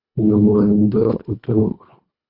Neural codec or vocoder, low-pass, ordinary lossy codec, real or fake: codec, 24 kHz, 1.5 kbps, HILCodec; 5.4 kHz; Opus, 64 kbps; fake